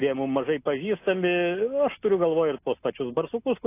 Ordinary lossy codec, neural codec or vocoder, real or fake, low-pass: AAC, 24 kbps; none; real; 3.6 kHz